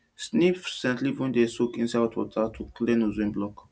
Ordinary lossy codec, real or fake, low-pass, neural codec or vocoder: none; real; none; none